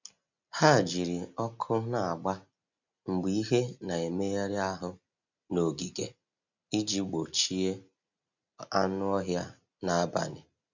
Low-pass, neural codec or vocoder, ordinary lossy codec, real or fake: 7.2 kHz; none; none; real